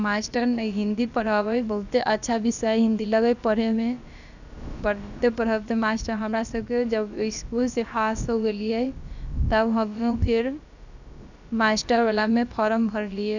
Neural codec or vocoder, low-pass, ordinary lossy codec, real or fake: codec, 16 kHz, about 1 kbps, DyCAST, with the encoder's durations; 7.2 kHz; none; fake